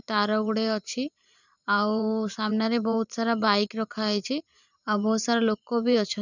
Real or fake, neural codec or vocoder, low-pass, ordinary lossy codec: fake; vocoder, 44.1 kHz, 128 mel bands every 512 samples, BigVGAN v2; 7.2 kHz; none